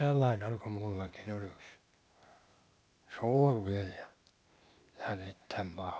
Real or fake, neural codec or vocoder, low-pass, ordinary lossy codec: fake; codec, 16 kHz, 0.8 kbps, ZipCodec; none; none